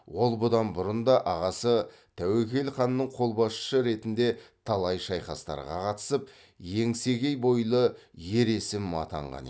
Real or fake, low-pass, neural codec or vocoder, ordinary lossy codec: real; none; none; none